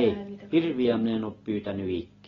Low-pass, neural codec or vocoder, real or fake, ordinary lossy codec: 7.2 kHz; none; real; AAC, 24 kbps